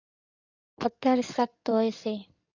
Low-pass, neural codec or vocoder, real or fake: 7.2 kHz; codec, 16 kHz in and 24 kHz out, 2.2 kbps, FireRedTTS-2 codec; fake